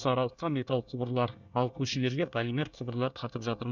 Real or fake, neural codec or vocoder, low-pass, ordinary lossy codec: fake; codec, 24 kHz, 1 kbps, SNAC; 7.2 kHz; none